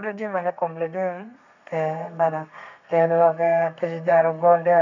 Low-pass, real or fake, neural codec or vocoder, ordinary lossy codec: 7.2 kHz; fake; codec, 32 kHz, 1.9 kbps, SNAC; none